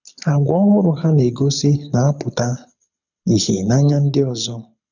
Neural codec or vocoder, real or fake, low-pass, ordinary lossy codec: codec, 24 kHz, 6 kbps, HILCodec; fake; 7.2 kHz; none